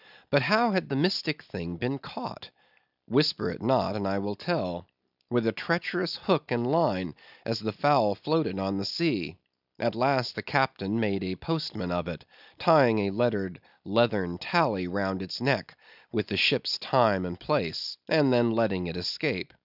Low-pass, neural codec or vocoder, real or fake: 5.4 kHz; none; real